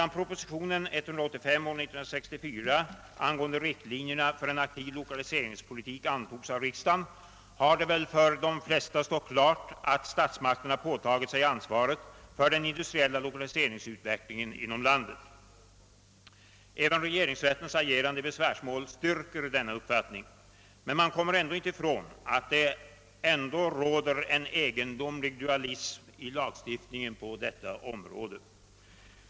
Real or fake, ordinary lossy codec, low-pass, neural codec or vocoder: real; none; none; none